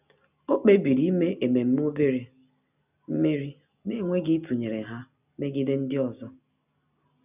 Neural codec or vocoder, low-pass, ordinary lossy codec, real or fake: none; 3.6 kHz; none; real